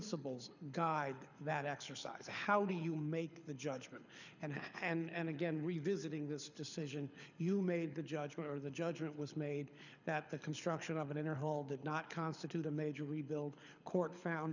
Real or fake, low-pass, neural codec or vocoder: fake; 7.2 kHz; codec, 24 kHz, 6 kbps, HILCodec